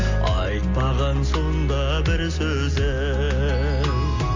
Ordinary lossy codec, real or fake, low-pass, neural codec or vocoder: MP3, 64 kbps; real; 7.2 kHz; none